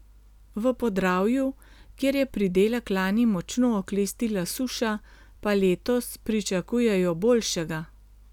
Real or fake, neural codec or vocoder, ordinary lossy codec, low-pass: real; none; none; 19.8 kHz